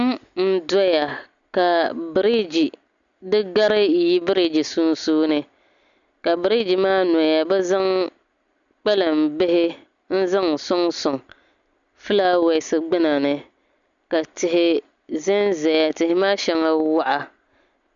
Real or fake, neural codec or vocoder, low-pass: real; none; 7.2 kHz